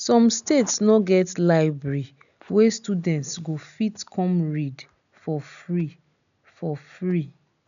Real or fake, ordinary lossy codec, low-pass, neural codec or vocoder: real; none; 7.2 kHz; none